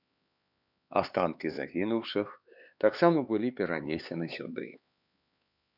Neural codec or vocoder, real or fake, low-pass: codec, 16 kHz, 4 kbps, X-Codec, HuBERT features, trained on LibriSpeech; fake; 5.4 kHz